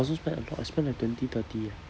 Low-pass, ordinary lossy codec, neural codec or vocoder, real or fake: none; none; none; real